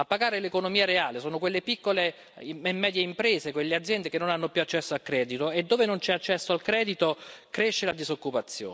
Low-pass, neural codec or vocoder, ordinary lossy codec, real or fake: none; none; none; real